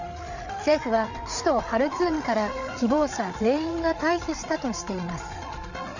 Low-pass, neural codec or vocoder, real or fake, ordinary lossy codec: 7.2 kHz; codec, 16 kHz, 8 kbps, FreqCodec, larger model; fake; none